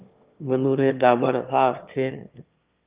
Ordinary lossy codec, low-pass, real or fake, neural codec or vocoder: Opus, 32 kbps; 3.6 kHz; fake; autoencoder, 22.05 kHz, a latent of 192 numbers a frame, VITS, trained on one speaker